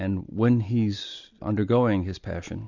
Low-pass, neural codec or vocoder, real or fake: 7.2 kHz; none; real